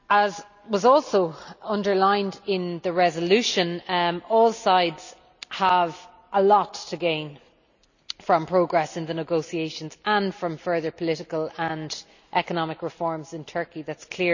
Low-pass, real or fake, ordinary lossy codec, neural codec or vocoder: 7.2 kHz; real; none; none